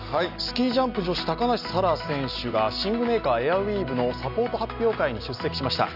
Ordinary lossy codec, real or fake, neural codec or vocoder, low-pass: none; real; none; 5.4 kHz